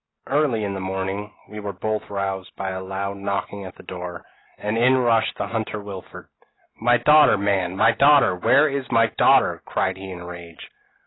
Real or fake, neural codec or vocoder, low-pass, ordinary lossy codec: real; none; 7.2 kHz; AAC, 16 kbps